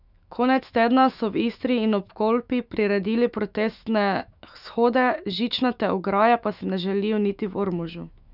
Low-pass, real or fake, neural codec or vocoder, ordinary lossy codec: 5.4 kHz; fake; autoencoder, 48 kHz, 128 numbers a frame, DAC-VAE, trained on Japanese speech; none